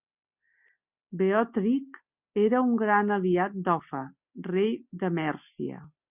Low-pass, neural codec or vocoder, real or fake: 3.6 kHz; none; real